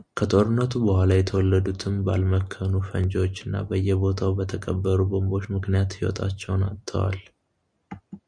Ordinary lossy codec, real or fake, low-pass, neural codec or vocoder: MP3, 96 kbps; real; 9.9 kHz; none